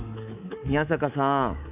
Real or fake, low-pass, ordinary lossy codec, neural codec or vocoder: fake; 3.6 kHz; none; codec, 24 kHz, 3.1 kbps, DualCodec